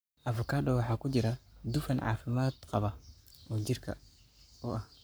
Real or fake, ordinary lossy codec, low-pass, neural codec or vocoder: fake; none; none; codec, 44.1 kHz, 7.8 kbps, Pupu-Codec